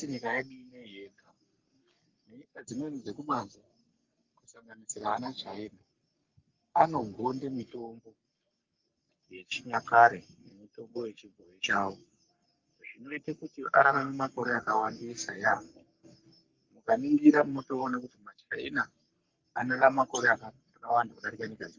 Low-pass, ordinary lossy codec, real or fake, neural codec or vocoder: 7.2 kHz; Opus, 32 kbps; fake; codec, 44.1 kHz, 3.4 kbps, Pupu-Codec